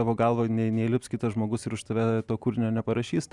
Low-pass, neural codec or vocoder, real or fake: 10.8 kHz; none; real